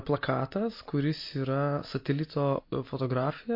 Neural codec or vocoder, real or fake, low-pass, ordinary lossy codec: none; real; 5.4 kHz; MP3, 32 kbps